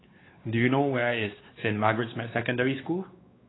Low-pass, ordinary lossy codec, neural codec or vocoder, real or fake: 7.2 kHz; AAC, 16 kbps; codec, 16 kHz, 4 kbps, X-Codec, WavLM features, trained on Multilingual LibriSpeech; fake